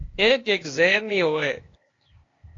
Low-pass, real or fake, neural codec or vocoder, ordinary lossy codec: 7.2 kHz; fake; codec, 16 kHz, 0.8 kbps, ZipCodec; AAC, 32 kbps